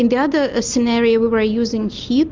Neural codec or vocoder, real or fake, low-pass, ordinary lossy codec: none; real; 7.2 kHz; Opus, 32 kbps